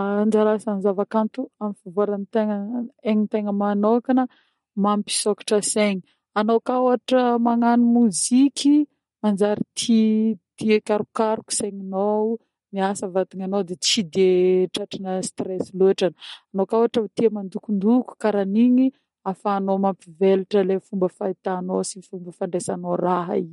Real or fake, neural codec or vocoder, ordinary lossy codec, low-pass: real; none; MP3, 48 kbps; 19.8 kHz